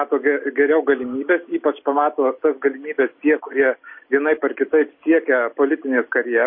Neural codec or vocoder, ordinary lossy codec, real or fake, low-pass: none; MP3, 24 kbps; real; 5.4 kHz